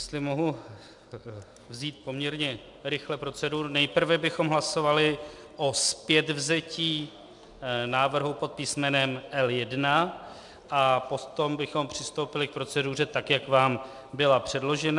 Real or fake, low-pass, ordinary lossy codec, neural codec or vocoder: real; 10.8 kHz; MP3, 96 kbps; none